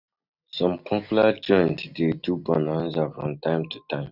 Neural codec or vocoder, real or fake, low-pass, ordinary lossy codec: none; real; 5.4 kHz; none